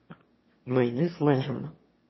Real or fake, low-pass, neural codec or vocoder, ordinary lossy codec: fake; 7.2 kHz; autoencoder, 22.05 kHz, a latent of 192 numbers a frame, VITS, trained on one speaker; MP3, 24 kbps